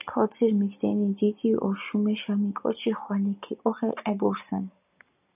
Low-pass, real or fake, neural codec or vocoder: 3.6 kHz; real; none